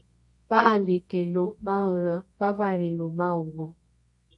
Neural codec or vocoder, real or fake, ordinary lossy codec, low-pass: codec, 24 kHz, 0.9 kbps, WavTokenizer, medium music audio release; fake; MP3, 48 kbps; 10.8 kHz